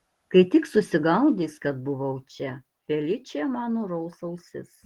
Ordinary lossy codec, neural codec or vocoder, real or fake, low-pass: Opus, 16 kbps; none; real; 19.8 kHz